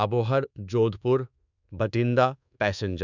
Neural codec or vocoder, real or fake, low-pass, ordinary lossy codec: codec, 24 kHz, 1.2 kbps, DualCodec; fake; 7.2 kHz; none